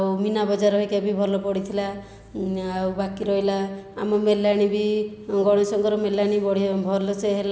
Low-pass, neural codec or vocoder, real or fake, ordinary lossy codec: none; none; real; none